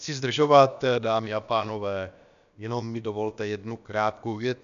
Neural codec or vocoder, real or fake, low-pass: codec, 16 kHz, about 1 kbps, DyCAST, with the encoder's durations; fake; 7.2 kHz